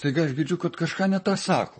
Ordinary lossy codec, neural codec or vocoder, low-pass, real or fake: MP3, 32 kbps; vocoder, 44.1 kHz, 128 mel bands, Pupu-Vocoder; 10.8 kHz; fake